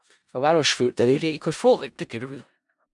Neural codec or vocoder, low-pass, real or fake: codec, 16 kHz in and 24 kHz out, 0.4 kbps, LongCat-Audio-Codec, four codebook decoder; 10.8 kHz; fake